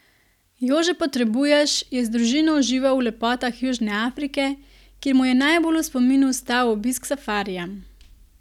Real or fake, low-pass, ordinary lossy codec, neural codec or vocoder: real; 19.8 kHz; none; none